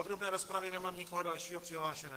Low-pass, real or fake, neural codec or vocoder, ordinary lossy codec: 14.4 kHz; fake; codec, 32 kHz, 1.9 kbps, SNAC; Opus, 16 kbps